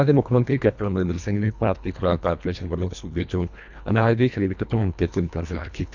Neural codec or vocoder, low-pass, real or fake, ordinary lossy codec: codec, 24 kHz, 1.5 kbps, HILCodec; 7.2 kHz; fake; none